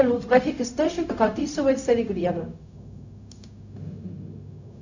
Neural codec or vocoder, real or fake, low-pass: codec, 16 kHz, 0.4 kbps, LongCat-Audio-Codec; fake; 7.2 kHz